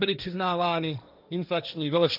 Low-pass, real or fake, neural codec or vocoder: 5.4 kHz; fake; codec, 16 kHz, 1.1 kbps, Voila-Tokenizer